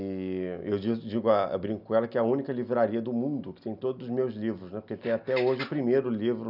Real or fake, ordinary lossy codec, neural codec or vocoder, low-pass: real; none; none; 5.4 kHz